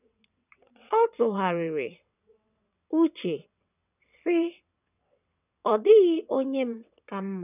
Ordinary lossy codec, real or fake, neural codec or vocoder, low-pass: none; fake; vocoder, 22.05 kHz, 80 mel bands, WaveNeXt; 3.6 kHz